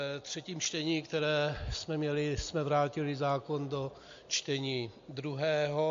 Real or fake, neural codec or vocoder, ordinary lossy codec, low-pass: real; none; MP3, 48 kbps; 7.2 kHz